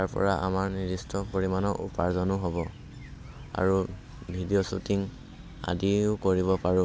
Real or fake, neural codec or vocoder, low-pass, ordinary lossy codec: real; none; none; none